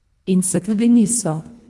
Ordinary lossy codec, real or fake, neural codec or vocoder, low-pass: none; fake; codec, 24 kHz, 1.5 kbps, HILCodec; none